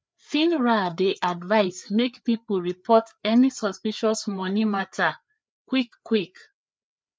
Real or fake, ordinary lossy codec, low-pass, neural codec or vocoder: fake; none; none; codec, 16 kHz, 4 kbps, FreqCodec, larger model